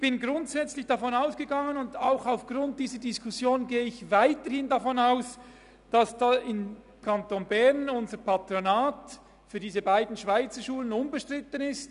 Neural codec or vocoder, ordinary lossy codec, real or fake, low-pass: none; none; real; 10.8 kHz